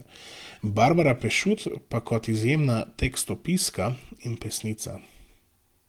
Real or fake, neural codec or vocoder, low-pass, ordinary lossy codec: real; none; 19.8 kHz; Opus, 24 kbps